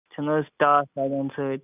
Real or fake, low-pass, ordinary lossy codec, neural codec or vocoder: real; 3.6 kHz; none; none